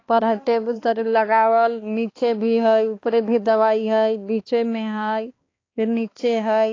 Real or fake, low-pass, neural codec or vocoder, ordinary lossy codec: fake; 7.2 kHz; codec, 16 kHz, 2 kbps, X-Codec, HuBERT features, trained on balanced general audio; AAC, 32 kbps